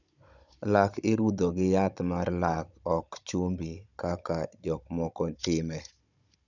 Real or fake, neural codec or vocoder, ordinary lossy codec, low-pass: fake; codec, 16 kHz, 16 kbps, FunCodec, trained on Chinese and English, 50 frames a second; none; 7.2 kHz